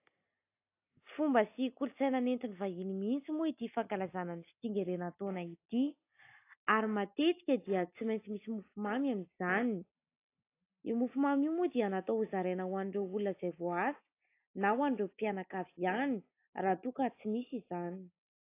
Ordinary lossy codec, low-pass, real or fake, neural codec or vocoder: AAC, 24 kbps; 3.6 kHz; real; none